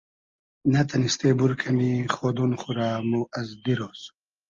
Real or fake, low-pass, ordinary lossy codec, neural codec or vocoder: real; 7.2 kHz; Opus, 32 kbps; none